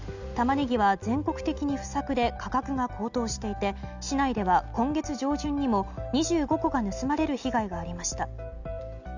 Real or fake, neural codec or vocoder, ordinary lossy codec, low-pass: real; none; none; 7.2 kHz